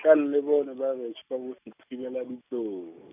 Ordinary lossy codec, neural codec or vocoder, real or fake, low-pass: none; none; real; 3.6 kHz